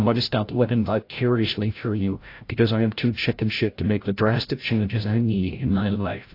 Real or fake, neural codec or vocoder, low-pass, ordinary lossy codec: fake; codec, 16 kHz, 0.5 kbps, FreqCodec, larger model; 5.4 kHz; AAC, 32 kbps